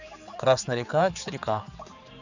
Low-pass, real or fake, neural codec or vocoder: 7.2 kHz; fake; codec, 16 kHz, 4 kbps, X-Codec, HuBERT features, trained on general audio